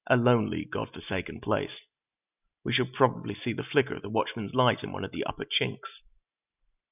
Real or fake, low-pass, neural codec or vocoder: real; 3.6 kHz; none